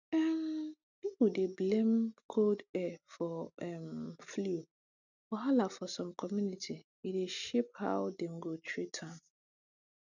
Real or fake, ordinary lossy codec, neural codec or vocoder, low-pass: real; none; none; 7.2 kHz